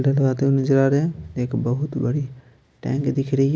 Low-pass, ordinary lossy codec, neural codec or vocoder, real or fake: none; none; none; real